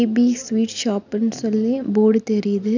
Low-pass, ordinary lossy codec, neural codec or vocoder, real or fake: 7.2 kHz; none; none; real